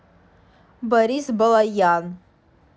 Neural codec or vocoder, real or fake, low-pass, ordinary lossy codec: none; real; none; none